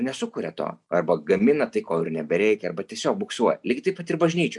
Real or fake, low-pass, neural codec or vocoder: real; 10.8 kHz; none